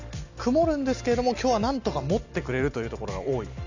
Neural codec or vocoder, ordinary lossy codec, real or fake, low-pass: none; none; real; 7.2 kHz